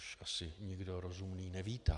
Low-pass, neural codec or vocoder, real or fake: 10.8 kHz; none; real